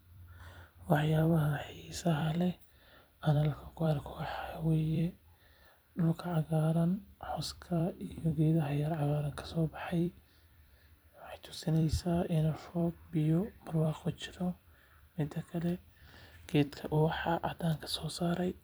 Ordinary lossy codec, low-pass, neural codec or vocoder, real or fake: none; none; vocoder, 44.1 kHz, 128 mel bands every 256 samples, BigVGAN v2; fake